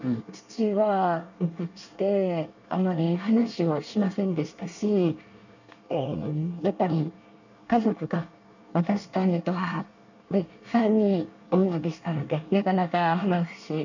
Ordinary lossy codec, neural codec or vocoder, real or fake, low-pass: none; codec, 24 kHz, 1 kbps, SNAC; fake; 7.2 kHz